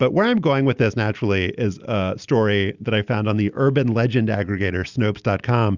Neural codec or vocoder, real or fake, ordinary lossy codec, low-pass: none; real; Opus, 64 kbps; 7.2 kHz